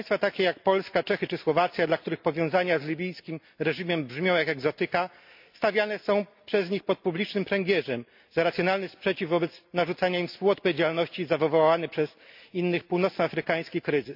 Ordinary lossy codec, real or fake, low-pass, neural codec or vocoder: MP3, 48 kbps; real; 5.4 kHz; none